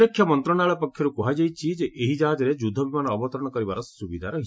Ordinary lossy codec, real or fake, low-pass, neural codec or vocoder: none; real; none; none